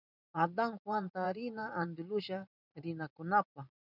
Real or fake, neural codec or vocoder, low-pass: fake; vocoder, 44.1 kHz, 128 mel bands every 512 samples, BigVGAN v2; 5.4 kHz